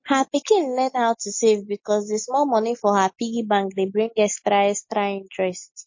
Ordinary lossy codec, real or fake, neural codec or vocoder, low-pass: MP3, 32 kbps; real; none; 7.2 kHz